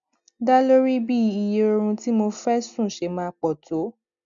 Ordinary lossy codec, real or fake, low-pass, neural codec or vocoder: none; real; 7.2 kHz; none